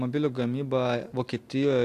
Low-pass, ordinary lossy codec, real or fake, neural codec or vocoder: 14.4 kHz; AAC, 64 kbps; real; none